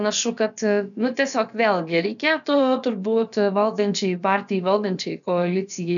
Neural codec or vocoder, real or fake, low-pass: codec, 16 kHz, about 1 kbps, DyCAST, with the encoder's durations; fake; 7.2 kHz